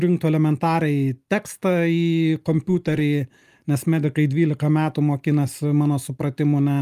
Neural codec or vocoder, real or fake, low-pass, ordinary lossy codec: none; real; 14.4 kHz; Opus, 32 kbps